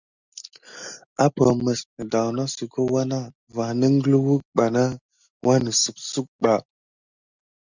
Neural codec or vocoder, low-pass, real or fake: none; 7.2 kHz; real